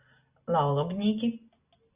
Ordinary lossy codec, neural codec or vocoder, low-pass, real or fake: Opus, 64 kbps; none; 3.6 kHz; real